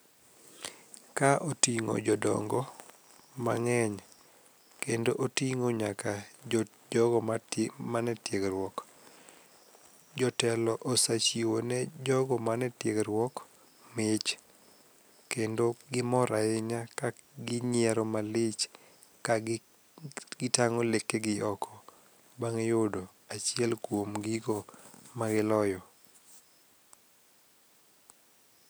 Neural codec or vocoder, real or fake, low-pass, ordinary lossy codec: none; real; none; none